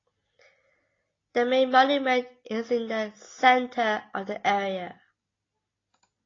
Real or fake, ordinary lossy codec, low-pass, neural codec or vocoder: real; AAC, 32 kbps; 7.2 kHz; none